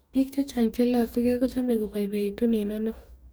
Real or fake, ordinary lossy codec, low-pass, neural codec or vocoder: fake; none; none; codec, 44.1 kHz, 2.6 kbps, DAC